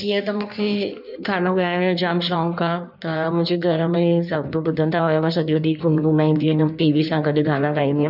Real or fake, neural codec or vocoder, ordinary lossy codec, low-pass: fake; codec, 16 kHz in and 24 kHz out, 1.1 kbps, FireRedTTS-2 codec; none; 5.4 kHz